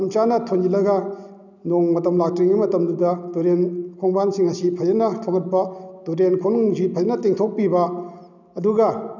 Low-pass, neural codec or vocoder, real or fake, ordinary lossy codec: 7.2 kHz; none; real; none